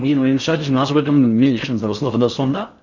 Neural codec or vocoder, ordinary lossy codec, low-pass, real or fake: codec, 16 kHz in and 24 kHz out, 0.6 kbps, FocalCodec, streaming, 4096 codes; none; 7.2 kHz; fake